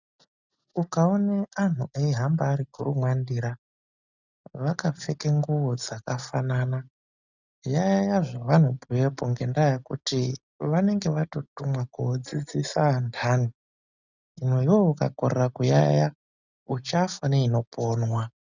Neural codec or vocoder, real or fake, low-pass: none; real; 7.2 kHz